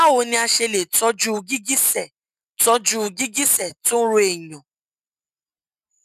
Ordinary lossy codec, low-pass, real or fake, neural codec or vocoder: none; 14.4 kHz; real; none